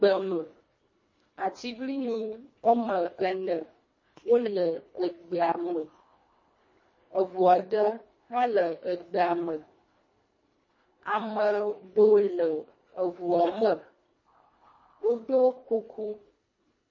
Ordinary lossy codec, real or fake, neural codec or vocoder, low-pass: MP3, 32 kbps; fake; codec, 24 kHz, 1.5 kbps, HILCodec; 7.2 kHz